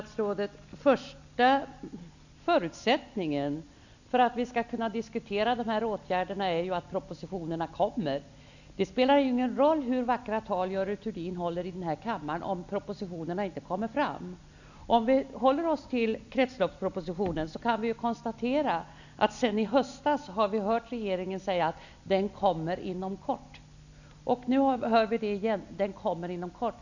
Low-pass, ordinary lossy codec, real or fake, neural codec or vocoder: 7.2 kHz; none; real; none